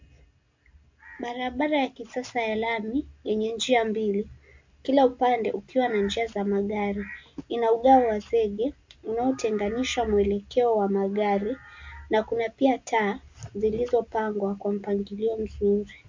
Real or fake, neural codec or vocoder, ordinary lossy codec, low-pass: real; none; MP3, 48 kbps; 7.2 kHz